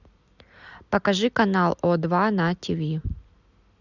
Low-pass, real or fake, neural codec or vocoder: 7.2 kHz; real; none